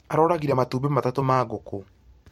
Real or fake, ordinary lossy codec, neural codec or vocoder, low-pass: fake; MP3, 64 kbps; vocoder, 44.1 kHz, 128 mel bands every 256 samples, BigVGAN v2; 19.8 kHz